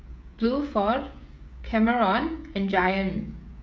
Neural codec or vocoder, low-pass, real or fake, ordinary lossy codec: codec, 16 kHz, 16 kbps, FreqCodec, smaller model; none; fake; none